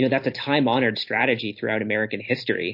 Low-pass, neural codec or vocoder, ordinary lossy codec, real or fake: 5.4 kHz; vocoder, 44.1 kHz, 128 mel bands every 256 samples, BigVGAN v2; MP3, 32 kbps; fake